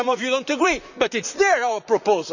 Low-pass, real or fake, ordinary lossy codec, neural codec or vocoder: 7.2 kHz; fake; none; vocoder, 44.1 kHz, 128 mel bands, Pupu-Vocoder